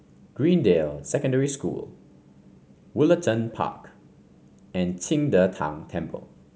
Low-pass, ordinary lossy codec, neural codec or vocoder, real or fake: none; none; none; real